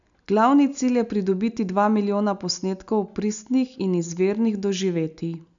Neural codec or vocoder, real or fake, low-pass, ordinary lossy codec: none; real; 7.2 kHz; none